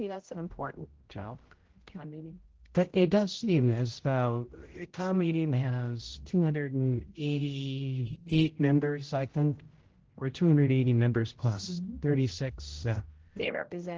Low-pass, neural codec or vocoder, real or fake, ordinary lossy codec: 7.2 kHz; codec, 16 kHz, 0.5 kbps, X-Codec, HuBERT features, trained on general audio; fake; Opus, 16 kbps